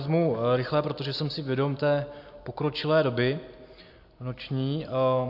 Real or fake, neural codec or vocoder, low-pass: real; none; 5.4 kHz